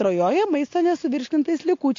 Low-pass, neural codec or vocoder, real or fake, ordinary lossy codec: 7.2 kHz; none; real; AAC, 48 kbps